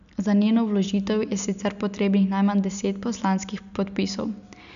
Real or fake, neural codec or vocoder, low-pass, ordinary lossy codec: real; none; 7.2 kHz; none